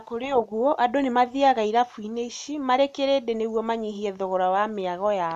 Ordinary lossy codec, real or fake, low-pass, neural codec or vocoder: none; real; 14.4 kHz; none